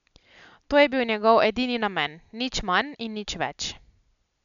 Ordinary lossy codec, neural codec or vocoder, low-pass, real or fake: none; none; 7.2 kHz; real